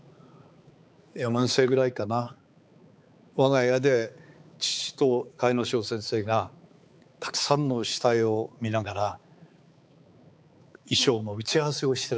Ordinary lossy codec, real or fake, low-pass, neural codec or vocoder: none; fake; none; codec, 16 kHz, 4 kbps, X-Codec, HuBERT features, trained on balanced general audio